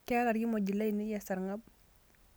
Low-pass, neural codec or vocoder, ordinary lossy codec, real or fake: none; none; none; real